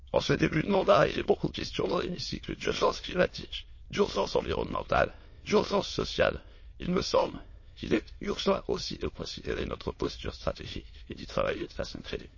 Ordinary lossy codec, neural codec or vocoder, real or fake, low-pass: MP3, 32 kbps; autoencoder, 22.05 kHz, a latent of 192 numbers a frame, VITS, trained on many speakers; fake; 7.2 kHz